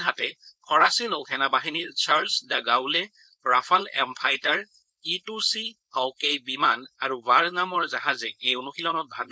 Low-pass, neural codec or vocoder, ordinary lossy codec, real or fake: none; codec, 16 kHz, 4.8 kbps, FACodec; none; fake